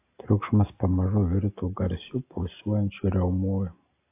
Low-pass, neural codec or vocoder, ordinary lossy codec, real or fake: 3.6 kHz; none; AAC, 24 kbps; real